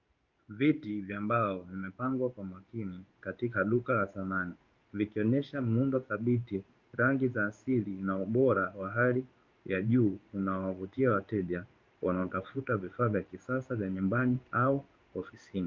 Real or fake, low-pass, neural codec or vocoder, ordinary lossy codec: fake; 7.2 kHz; codec, 16 kHz in and 24 kHz out, 1 kbps, XY-Tokenizer; Opus, 24 kbps